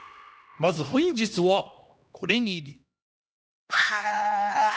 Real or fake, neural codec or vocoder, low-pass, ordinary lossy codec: fake; codec, 16 kHz, 1 kbps, X-Codec, HuBERT features, trained on LibriSpeech; none; none